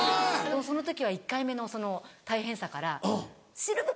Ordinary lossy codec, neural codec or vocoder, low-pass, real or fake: none; none; none; real